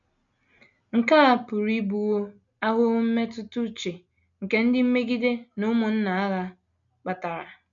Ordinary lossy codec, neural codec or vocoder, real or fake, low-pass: none; none; real; 7.2 kHz